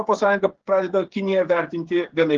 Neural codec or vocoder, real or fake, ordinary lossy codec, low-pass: codec, 16 kHz, 8 kbps, FreqCodec, smaller model; fake; Opus, 16 kbps; 7.2 kHz